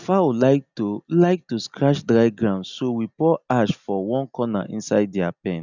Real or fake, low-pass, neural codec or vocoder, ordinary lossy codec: real; 7.2 kHz; none; none